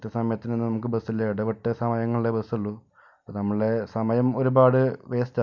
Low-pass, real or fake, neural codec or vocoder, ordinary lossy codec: 7.2 kHz; real; none; none